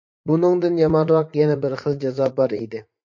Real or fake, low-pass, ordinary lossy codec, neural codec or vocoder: fake; 7.2 kHz; MP3, 48 kbps; vocoder, 44.1 kHz, 80 mel bands, Vocos